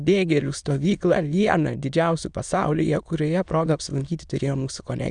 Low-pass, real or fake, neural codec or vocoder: 9.9 kHz; fake; autoencoder, 22.05 kHz, a latent of 192 numbers a frame, VITS, trained on many speakers